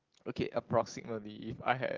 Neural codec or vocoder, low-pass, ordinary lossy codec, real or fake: none; 7.2 kHz; Opus, 16 kbps; real